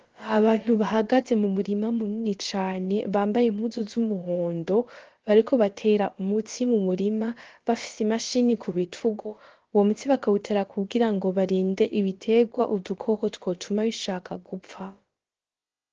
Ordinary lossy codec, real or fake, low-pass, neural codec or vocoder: Opus, 16 kbps; fake; 7.2 kHz; codec, 16 kHz, about 1 kbps, DyCAST, with the encoder's durations